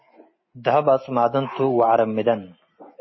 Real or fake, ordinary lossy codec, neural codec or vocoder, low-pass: real; MP3, 24 kbps; none; 7.2 kHz